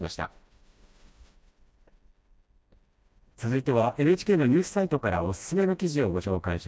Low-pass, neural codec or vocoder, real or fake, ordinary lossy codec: none; codec, 16 kHz, 1 kbps, FreqCodec, smaller model; fake; none